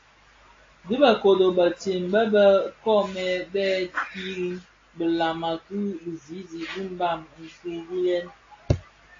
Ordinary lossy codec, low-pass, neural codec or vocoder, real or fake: AAC, 32 kbps; 7.2 kHz; none; real